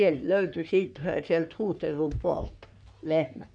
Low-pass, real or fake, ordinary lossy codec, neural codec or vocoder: 9.9 kHz; fake; none; codec, 44.1 kHz, 3.4 kbps, Pupu-Codec